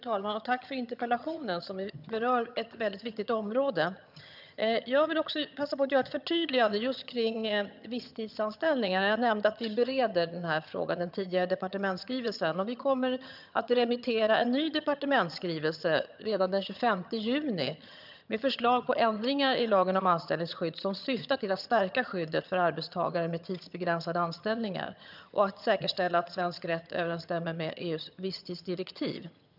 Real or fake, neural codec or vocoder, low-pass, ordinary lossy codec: fake; vocoder, 22.05 kHz, 80 mel bands, HiFi-GAN; 5.4 kHz; none